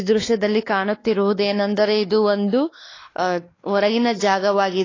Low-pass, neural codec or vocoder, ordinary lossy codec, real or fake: 7.2 kHz; codec, 16 kHz, 2 kbps, X-Codec, WavLM features, trained on Multilingual LibriSpeech; AAC, 32 kbps; fake